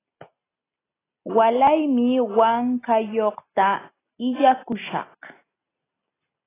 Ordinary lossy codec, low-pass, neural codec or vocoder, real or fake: AAC, 16 kbps; 3.6 kHz; none; real